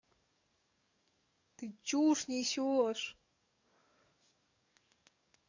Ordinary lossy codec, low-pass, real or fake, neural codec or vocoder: none; 7.2 kHz; fake; codec, 44.1 kHz, 7.8 kbps, DAC